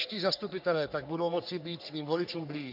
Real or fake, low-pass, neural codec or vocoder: fake; 5.4 kHz; codec, 44.1 kHz, 3.4 kbps, Pupu-Codec